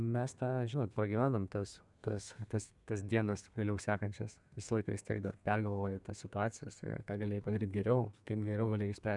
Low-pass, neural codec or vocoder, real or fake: 10.8 kHz; codec, 32 kHz, 1.9 kbps, SNAC; fake